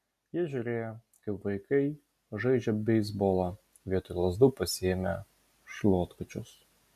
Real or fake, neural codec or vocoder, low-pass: real; none; 14.4 kHz